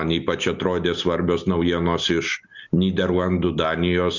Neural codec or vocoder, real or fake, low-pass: none; real; 7.2 kHz